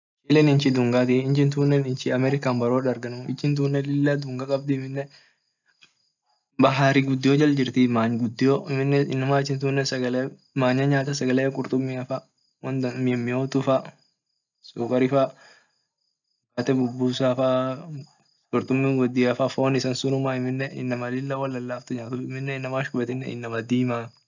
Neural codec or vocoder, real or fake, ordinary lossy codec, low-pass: none; real; none; 7.2 kHz